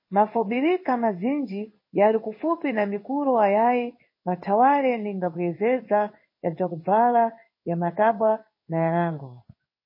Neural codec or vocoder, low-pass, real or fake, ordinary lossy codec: codec, 16 kHz in and 24 kHz out, 1 kbps, XY-Tokenizer; 5.4 kHz; fake; MP3, 24 kbps